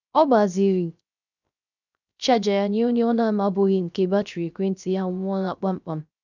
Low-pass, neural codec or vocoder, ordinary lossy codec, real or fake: 7.2 kHz; codec, 16 kHz, 0.3 kbps, FocalCodec; none; fake